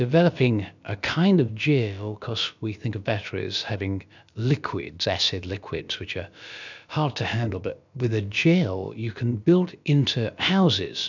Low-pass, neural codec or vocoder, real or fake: 7.2 kHz; codec, 16 kHz, about 1 kbps, DyCAST, with the encoder's durations; fake